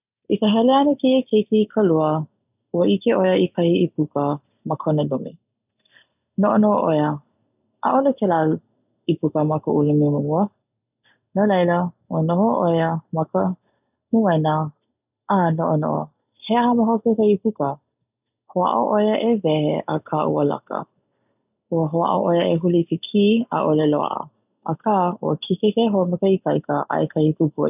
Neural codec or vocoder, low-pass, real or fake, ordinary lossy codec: none; 3.6 kHz; real; none